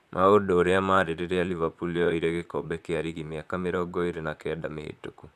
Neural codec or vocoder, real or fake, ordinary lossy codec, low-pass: vocoder, 44.1 kHz, 128 mel bands, Pupu-Vocoder; fake; none; 14.4 kHz